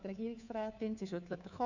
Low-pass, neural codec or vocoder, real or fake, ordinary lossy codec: 7.2 kHz; codec, 24 kHz, 3.1 kbps, DualCodec; fake; none